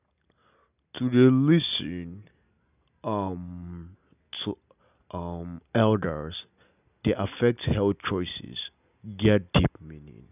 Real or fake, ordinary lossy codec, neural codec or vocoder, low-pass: real; none; none; 3.6 kHz